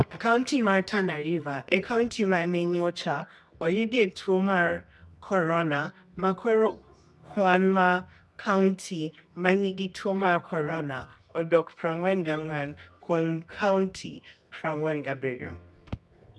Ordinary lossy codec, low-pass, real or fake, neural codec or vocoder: none; none; fake; codec, 24 kHz, 0.9 kbps, WavTokenizer, medium music audio release